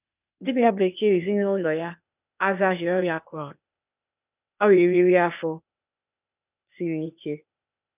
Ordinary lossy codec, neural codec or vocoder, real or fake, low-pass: none; codec, 16 kHz, 0.8 kbps, ZipCodec; fake; 3.6 kHz